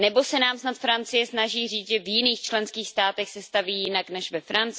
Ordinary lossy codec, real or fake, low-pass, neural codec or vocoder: none; real; none; none